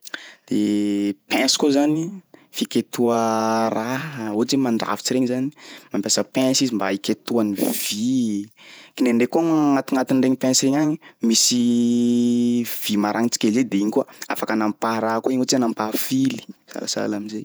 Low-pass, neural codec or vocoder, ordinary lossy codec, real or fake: none; vocoder, 48 kHz, 128 mel bands, Vocos; none; fake